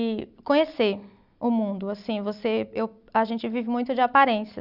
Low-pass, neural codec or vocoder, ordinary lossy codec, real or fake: 5.4 kHz; none; none; real